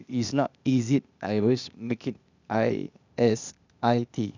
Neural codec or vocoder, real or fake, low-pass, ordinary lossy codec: codec, 16 kHz, 0.8 kbps, ZipCodec; fake; 7.2 kHz; none